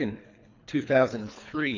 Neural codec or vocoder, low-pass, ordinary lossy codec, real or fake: codec, 24 kHz, 3 kbps, HILCodec; 7.2 kHz; AAC, 48 kbps; fake